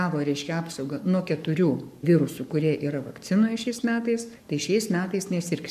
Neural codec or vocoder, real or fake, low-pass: codec, 44.1 kHz, 7.8 kbps, Pupu-Codec; fake; 14.4 kHz